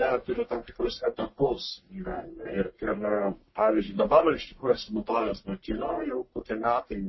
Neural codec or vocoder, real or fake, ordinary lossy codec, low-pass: codec, 44.1 kHz, 1.7 kbps, Pupu-Codec; fake; MP3, 24 kbps; 7.2 kHz